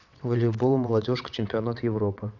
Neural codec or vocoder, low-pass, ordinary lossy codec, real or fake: vocoder, 22.05 kHz, 80 mel bands, WaveNeXt; 7.2 kHz; none; fake